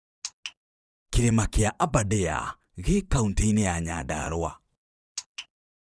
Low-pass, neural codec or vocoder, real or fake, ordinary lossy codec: none; vocoder, 22.05 kHz, 80 mel bands, Vocos; fake; none